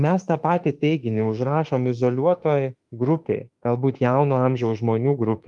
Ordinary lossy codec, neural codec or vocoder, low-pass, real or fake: Opus, 32 kbps; autoencoder, 48 kHz, 32 numbers a frame, DAC-VAE, trained on Japanese speech; 10.8 kHz; fake